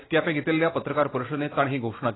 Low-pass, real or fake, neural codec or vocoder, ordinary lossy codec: 7.2 kHz; real; none; AAC, 16 kbps